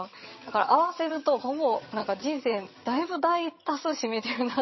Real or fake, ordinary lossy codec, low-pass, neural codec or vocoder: fake; MP3, 24 kbps; 7.2 kHz; vocoder, 22.05 kHz, 80 mel bands, HiFi-GAN